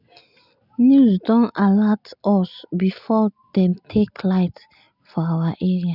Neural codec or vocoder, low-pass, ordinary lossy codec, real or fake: none; 5.4 kHz; none; real